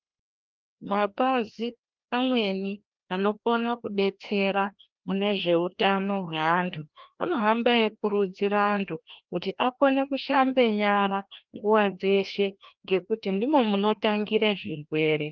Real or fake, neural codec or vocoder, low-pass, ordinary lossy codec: fake; codec, 16 kHz, 1 kbps, FreqCodec, larger model; 7.2 kHz; Opus, 32 kbps